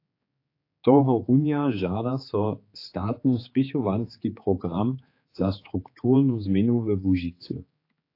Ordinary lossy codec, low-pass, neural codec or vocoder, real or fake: AAC, 32 kbps; 5.4 kHz; codec, 16 kHz, 4 kbps, X-Codec, HuBERT features, trained on general audio; fake